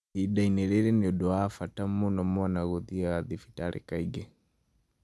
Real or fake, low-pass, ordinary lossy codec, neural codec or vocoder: real; none; none; none